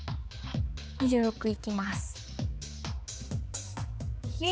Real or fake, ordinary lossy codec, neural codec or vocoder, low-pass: fake; none; codec, 16 kHz, 4 kbps, X-Codec, HuBERT features, trained on balanced general audio; none